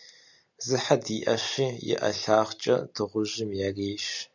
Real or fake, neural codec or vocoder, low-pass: real; none; 7.2 kHz